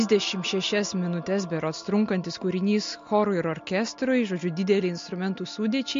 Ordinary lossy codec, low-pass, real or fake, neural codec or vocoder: MP3, 48 kbps; 7.2 kHz; real; none